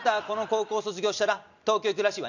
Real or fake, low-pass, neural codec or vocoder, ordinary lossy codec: real; 7.2 kHz; none; none